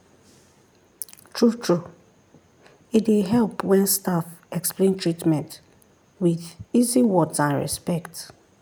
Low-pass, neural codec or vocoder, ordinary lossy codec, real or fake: none; none; none; real